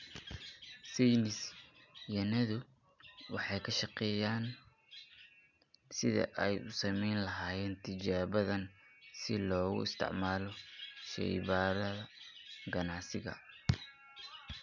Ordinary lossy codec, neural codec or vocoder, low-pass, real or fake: none; none; 7.2 kHz; real